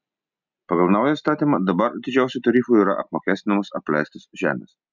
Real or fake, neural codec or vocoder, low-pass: real; none; 7.2 kHz